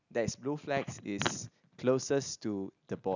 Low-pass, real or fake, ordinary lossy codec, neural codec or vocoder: 7.2 kHz; real; none; none